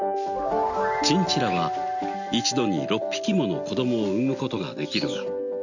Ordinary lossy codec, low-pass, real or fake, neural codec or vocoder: none; 7.2 kHz; real; none